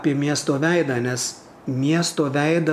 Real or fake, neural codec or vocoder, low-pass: real; none; 14.4 kHz